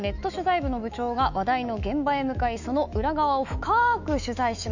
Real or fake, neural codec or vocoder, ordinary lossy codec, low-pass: fake; autoencoder, 48 kHz, 128 numbers a frame, DAC-VAE, trained on Japanese speech; none; 7.2 kHz